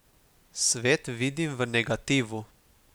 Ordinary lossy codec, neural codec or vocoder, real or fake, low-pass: none; none; real; none